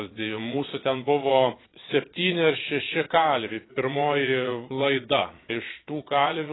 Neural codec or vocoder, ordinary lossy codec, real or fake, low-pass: vocoder, 22.05 kHz, 80 mel bands, Vocos; AAC, 16 kbps; fake; 7.2 kHz